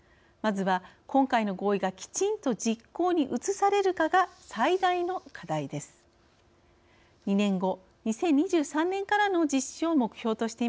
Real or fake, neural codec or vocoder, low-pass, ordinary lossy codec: real; none; none; none